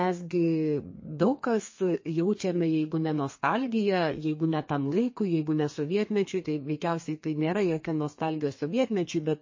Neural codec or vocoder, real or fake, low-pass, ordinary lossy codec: codec, 32 kHz, 1.9 kbps, SNAC; fake; 7.2 kHz; MP3, 32 kbps